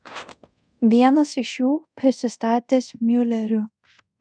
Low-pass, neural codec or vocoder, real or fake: 9.9 kHz; codec, 24 kHz, 0.5 kbps, DualCodec; fake